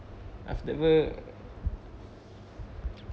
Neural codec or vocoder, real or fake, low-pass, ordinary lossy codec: none; real; none; none